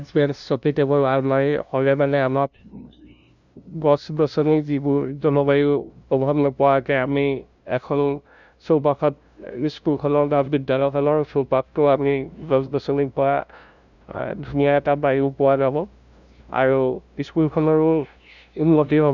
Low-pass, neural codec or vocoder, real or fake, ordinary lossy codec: 7.2 kHz; codec, 16 kHz, 0.5 kbps, FunCodec, trained on LibriTTS, 25 frames a second; fake; none